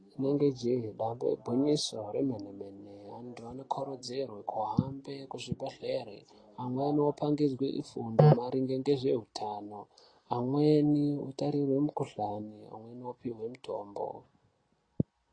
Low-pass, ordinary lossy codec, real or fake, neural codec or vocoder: 9.9 kHz; AAC, 32 kbps; real; none